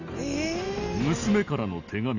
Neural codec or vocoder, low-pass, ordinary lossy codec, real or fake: none; 7.2 kHz; none; real